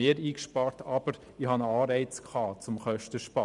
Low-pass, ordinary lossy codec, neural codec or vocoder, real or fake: 10.8 kHz; none; vocoder, 44.1 kHz, 128 mel bands every 512 samples, BigVGAN v2; fake